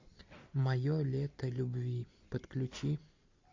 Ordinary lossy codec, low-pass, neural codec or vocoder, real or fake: MP3, 48 kbps; 7.2 kHz; none; real